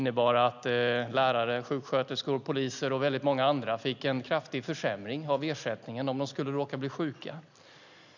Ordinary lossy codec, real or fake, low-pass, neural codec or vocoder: none; real; 7.2 kHz; none